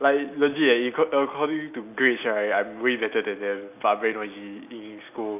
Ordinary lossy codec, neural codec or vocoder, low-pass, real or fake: none; none; 3.6 kHz; real